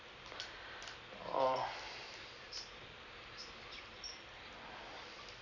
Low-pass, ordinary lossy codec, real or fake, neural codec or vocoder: 7.2 kHz; none; fake; vocoder, 44.1 kHz, 128 mel bands every 256 samples, BigVGAN v2